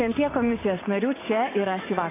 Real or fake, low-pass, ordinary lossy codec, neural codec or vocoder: fake; 3.6 kHz; AAC, 16 kbps; vocoder, 44.1 kHz, 80 mel bands, Vocos